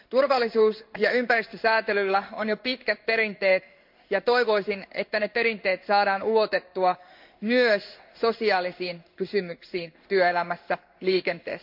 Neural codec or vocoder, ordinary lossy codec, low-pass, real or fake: codec, 16 kHz in and 24 kHz out, 1 kbps, XY-Tokenizer; MP3, 48 kbps; 5.4 kHz; fake